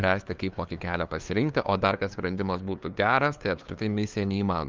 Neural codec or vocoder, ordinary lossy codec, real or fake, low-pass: codec, 16 kHz, 2 kbps, FunCodec, trained on LibriTTS, 25 frames a second; Opus, 24 kbps; fake; 7.2 kHz